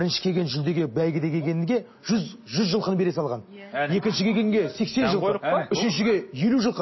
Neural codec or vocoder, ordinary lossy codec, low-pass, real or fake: none; MP3, 24 kbps; 7.2 kHz; real